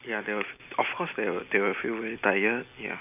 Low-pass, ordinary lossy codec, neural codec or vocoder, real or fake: 3.6 kHz; none; none; real